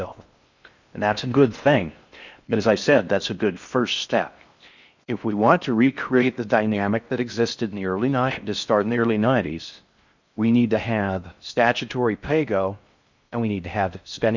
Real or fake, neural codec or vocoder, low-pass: fake; codec, 16 kHz in and 24 kHz out, 0.6 kbps, FocalCodec, streaming, 4096 codes; 7.2 kHz